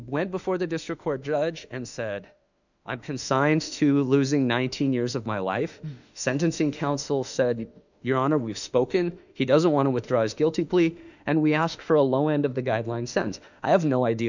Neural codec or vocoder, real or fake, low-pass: autoencoder, 48 kHz, 32 numbers a frame, DAC-VAE, trained on Japanese speech; fake; 7.2 kHz